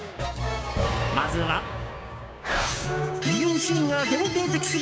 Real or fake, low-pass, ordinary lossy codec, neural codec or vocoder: fake; none; none; codec, 16 kHz, 6 kbps, DAC